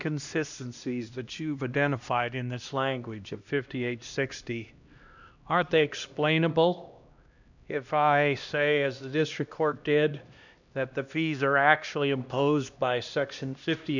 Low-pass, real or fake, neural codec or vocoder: 7.2 kHz; fake; codec, 16 kHz, 1 kbps, X-Codec, HuBERT features, trained on LibriSpeech